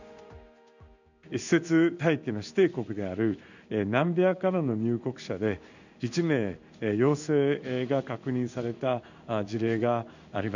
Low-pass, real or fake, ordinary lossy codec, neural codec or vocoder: 7.2 kHz; fake; none; codec, 16 kHz in and 24 kHz out, 1 kbps, XY-Tokenizer